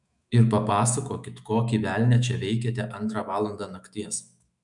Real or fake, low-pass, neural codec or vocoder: fake; 10.8 kHz; autoencoder, 48 kHz, 128 numbers a frame, DAC-VAE, trained on Japanese speech